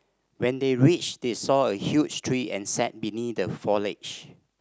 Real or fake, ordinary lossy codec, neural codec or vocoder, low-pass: real; none; none; none